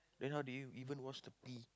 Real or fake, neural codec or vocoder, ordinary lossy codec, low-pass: real; none; none; none